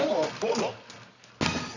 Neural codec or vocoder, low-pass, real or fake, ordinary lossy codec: codec, 24 kHz, 0.9 kbps, WavTokenizer, medium music audio release; 7.2 kHz; fake; none